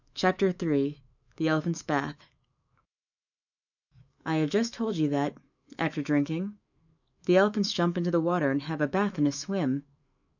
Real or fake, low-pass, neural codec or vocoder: fake; 7.2 kHz; autoencoder, 48 kHz, 128 numbers a frame, DAC-VAE, trained on Japanese speech